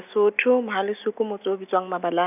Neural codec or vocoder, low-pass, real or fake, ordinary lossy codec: none; 3.6 kHz; real; none